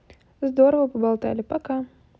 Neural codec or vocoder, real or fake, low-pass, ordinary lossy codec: none; real; none; none